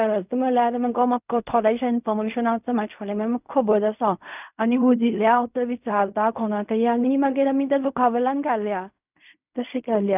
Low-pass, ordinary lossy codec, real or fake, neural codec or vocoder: 3.6 kHz; none; fake; codec, 16 kHz in and 24 kHz out, 0.4 kbps, LongCat-Audio-Codec, fine tuned four codebook decoder